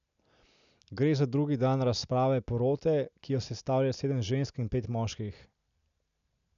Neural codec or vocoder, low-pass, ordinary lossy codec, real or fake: none; 7.2 kHz; none; real